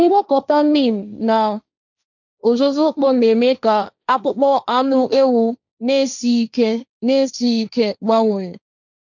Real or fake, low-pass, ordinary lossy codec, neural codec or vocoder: fake; 7.2 kHz; none; codec, 16 kHz, 1.1 kbps, Voila-Tokenizer